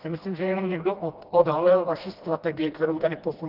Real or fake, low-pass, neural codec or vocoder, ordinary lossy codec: fake; 5.4 kHz; codec, 16 kHz, 1 kbps, FreqCodec, smaller model; Opus, 32 kbps